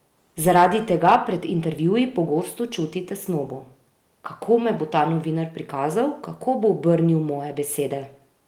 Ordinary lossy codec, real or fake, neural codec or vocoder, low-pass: Opus, 24 kbps; real; none; 19.8 kHz